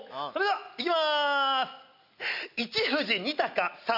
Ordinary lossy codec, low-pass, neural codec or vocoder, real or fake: none; 5.4 kHz; none; real